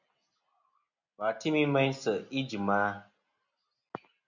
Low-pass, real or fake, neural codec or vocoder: 7.2 kHz; real; none